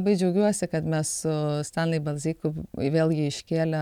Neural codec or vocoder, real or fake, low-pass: none; real; 19.8 kHz